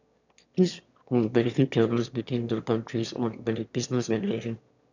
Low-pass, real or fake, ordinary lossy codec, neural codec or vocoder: 7.2 kHz; fake; none; autoencoder, 22.05 kHz, a latent of 192 numbers a frame, VITS, trained on one speaker